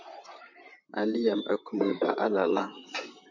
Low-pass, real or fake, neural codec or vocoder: 7.2 kHz; fake; vocoder, 44.1 kHz, 128 mel bands, Pupu-Vocoder